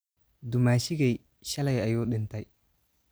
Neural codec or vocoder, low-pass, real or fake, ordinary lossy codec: none; none; real; none